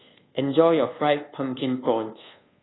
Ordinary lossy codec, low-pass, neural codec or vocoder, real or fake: AAC, 16 kbps; 7.2 kHz; codec, 24 kHz, 1.2 kbps, DualCodec; fake